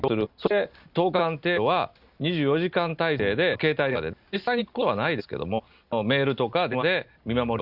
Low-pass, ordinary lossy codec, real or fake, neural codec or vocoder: 5.4 kHz; Opus, 64 kbps; real; none